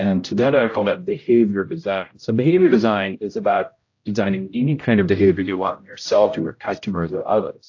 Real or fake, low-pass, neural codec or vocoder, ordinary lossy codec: fake; 7.2 kHz; codec, 16 kHz, 0.5 kbps, X-Codec, HuBERT features, trained on general audio; AAC, 48 kbps